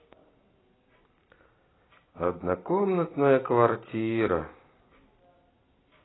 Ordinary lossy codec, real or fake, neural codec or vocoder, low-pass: AAC, 16 kbps; real; none; 7.2 kHz